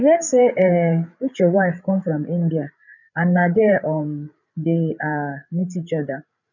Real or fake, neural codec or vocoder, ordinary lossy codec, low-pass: fake; codec, 16 kHz, 8 kbps, FreqCodec, larger model; none; 7.2 kHz